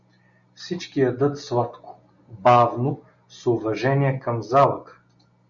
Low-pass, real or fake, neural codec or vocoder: 7.2 kHz; real; none